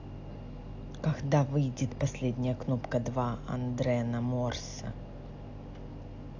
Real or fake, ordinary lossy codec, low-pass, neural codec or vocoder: real; AAC, 48 kbps; 7.2 kHz; none